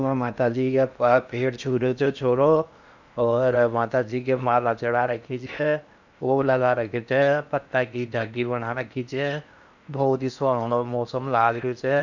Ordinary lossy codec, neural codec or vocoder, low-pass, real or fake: none; codec, 16 kHz in and 24 kHz out, 0.8 kbps, FocalCodec, streaming, 65536 codes; 7.2 kHz; fake